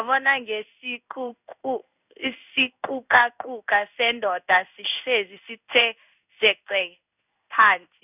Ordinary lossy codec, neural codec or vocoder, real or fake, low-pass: none; codec, 16 kHz in and 24 kHz out, 1 kbps, XY-Tokenizer; fake; 3.6 kHz